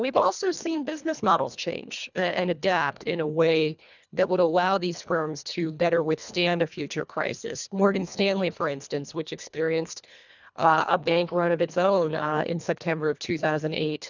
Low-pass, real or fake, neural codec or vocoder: 7.2 kHz; fake; codec, 24 kHz, 1.5 kbps, HILCodec